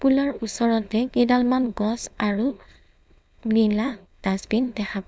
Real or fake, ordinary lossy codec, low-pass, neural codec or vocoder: fake; none; none; codec, 16 kHz, 4.8 kbps, FACodec